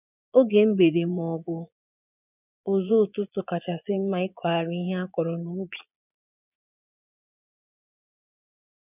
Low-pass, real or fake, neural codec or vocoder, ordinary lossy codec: 3.6 kHz; fake; vocoder, 22.05 kHz, 80 mel bands, Vocos; none